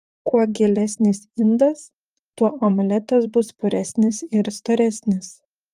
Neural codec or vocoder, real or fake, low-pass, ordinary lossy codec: vocoder, 44.1 kHz, 128 mel bands, Pupu-Vocoder; fake; 14.4 kHz; Opus, 32 kbps